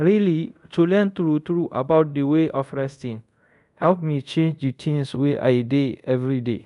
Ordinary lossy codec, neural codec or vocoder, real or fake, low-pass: none; codec, 24 kHz, 0.5 kbps, DualCodec; fake; 10.8 kHz